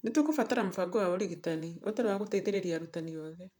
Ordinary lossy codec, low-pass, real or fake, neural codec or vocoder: none; none; fake; vocoder, 44.1 kHz, 128 mel bands, Pupu-Vocoder